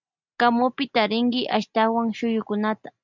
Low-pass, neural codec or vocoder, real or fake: 7.2 kHz; none; real